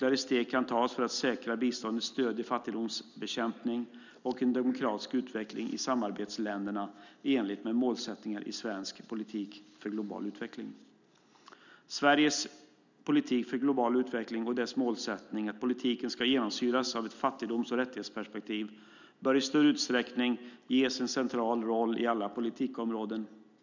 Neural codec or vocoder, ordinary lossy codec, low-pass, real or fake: none; none; 7.2 kHz; real